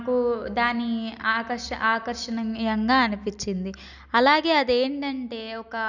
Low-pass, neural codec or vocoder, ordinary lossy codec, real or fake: 7.2 kHz; none; none; real